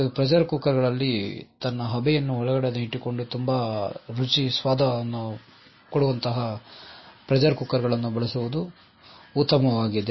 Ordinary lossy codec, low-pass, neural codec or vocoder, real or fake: MP3, 24 kbps; 7.2 kHz; none; real